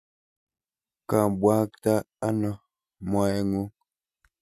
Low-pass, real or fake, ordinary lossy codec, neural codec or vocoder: 14.4 kHz; real; none; none